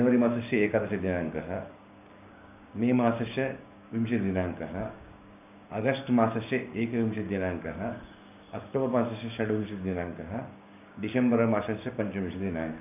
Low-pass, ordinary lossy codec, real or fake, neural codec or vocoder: 3.6 kHz; none; fake; codec, 16 kHz, 6 kbps, DAC